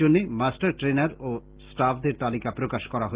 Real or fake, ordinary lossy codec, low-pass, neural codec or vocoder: real; Opus, 16 kbps; 3.6 kHz; none